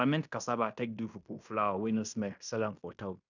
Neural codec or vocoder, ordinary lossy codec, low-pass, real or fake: codec, 16 kHz in and 24 kHz out, 0.9 kbps, LongCat-Audio-Codec, fine tuned four codebook decoder; none; 7.2 kHz; fake